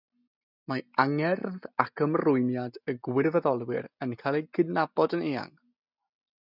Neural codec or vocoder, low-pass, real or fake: none; 5.4 kHz; real